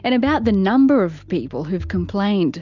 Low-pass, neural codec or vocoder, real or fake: 7.2 kHz; none; real